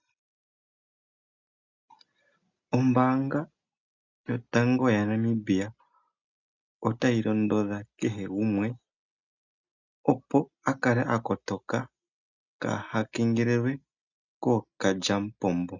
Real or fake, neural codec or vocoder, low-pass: real; none; 7.2 kHz